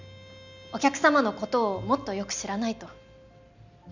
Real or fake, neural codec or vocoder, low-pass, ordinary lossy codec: real; none; 7.2 kHz; none